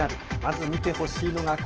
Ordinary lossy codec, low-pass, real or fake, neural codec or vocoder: Opus, 16 kbps; 7.2 kHz; real; none